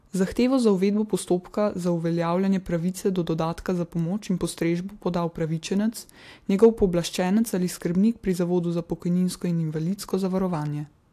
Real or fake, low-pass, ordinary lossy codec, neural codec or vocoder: real; 14.4 kHz; AAC, 64 kbps; none